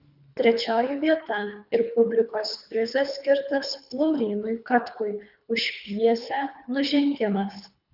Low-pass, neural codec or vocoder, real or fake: 5.4 kHz; codec, 24 kHz, 3 kbps, HILCodec; fake